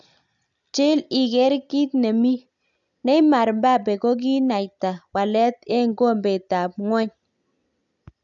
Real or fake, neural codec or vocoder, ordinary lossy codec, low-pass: real; none; none; 7.2 kHz